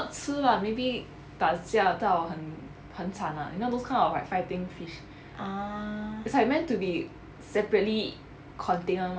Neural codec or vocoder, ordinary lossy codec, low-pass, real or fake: none; none; none; real